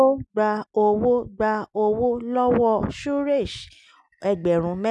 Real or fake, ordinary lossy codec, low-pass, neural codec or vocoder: real; none; none; none